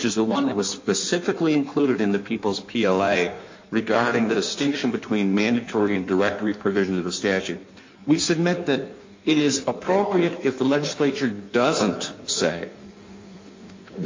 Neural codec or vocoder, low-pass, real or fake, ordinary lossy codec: codec, 16 kHz in and 24 kHz out, 1.1 kbps, FireRedTTS-2 codec; 7.2 kHz; fake; MP3, 48 kbps